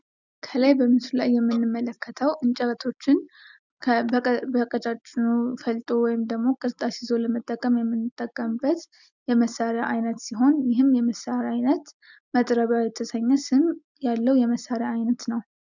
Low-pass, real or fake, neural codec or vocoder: 7.2 kHz; real; none